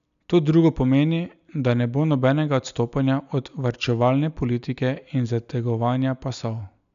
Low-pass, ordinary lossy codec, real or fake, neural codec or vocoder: 7.2 kHz; none; real; none